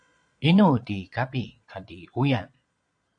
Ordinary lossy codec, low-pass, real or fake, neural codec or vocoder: MP3, 64 kbps; 9.9 kHz; fake; vocoder, 22.05 kHz, 80 mel bands, Vocos